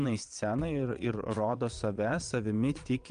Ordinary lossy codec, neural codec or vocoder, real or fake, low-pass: Opus, 32 kbps; vocoder, 22.05 kHz, 80 mel bands, WaveNeXt; fake; 9.9 kHz